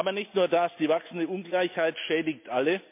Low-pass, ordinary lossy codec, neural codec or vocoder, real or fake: 3.6 kHz; MP3, 32 kbps; none; real